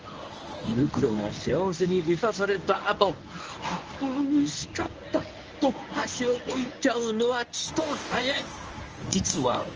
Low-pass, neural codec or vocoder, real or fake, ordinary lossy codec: 7.2 kHz; codec, 24 kHz, 0.9 kbps, WavTokenizer, medium speech release version 1; fake; Opus, 24 kbps